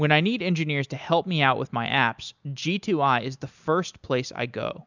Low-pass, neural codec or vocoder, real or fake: 7.2 kHz; none; real